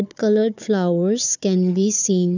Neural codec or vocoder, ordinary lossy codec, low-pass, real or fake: codec, 16 kHz, 4 kbps, FunCodec, trained on Chinese and English, 50 frames a second; none; 7.2 kHz; fake